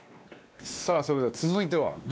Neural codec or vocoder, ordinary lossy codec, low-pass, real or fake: codec, 16 kHz, 2 kbps, X-Codec, WavLM features, trained on Multilingual LibriSpeech; none; none; fake